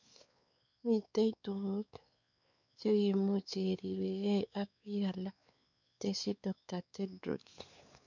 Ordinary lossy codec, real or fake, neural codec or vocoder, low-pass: none; fake; codec, 16 kHz, 4 kbps, X-Codec, WavLM features, trained on Multilingual LibriSpeech; 7.2 kHz